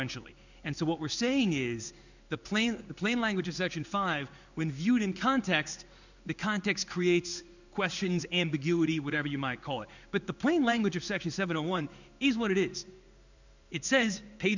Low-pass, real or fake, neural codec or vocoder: 7.2 kHz; fake; codec, 16 kHz in and 24 kHz out, 1 kbps, XY-Tokenizer